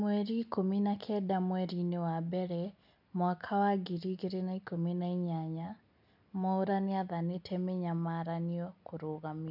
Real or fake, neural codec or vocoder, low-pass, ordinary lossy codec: real; none; 5.4 kHz; none